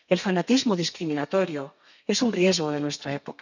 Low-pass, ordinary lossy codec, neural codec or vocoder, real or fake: 7.2 kHz; none; codec, 32 kHz, 1.9 kbps, SNAC; fake